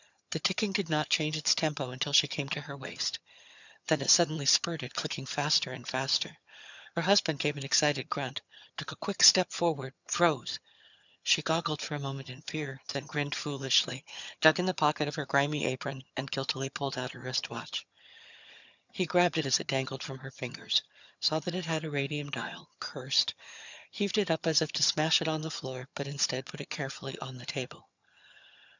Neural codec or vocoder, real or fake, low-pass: vocoder, 22.05 kHz, 80 mel bands, HiFi-GAN; fake; 7.2 kHz